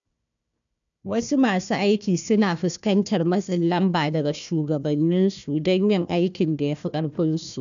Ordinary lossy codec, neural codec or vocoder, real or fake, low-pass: none; codec, 16 kHz, 1 kbps, FunCodec, trained on Chinese and English, 50 frames a second; fake; 7.2 kHz